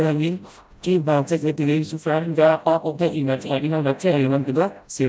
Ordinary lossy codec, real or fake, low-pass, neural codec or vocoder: none; fake; none; codec, 16 kHz, 0.5 kbps, FreqCodec, smaller model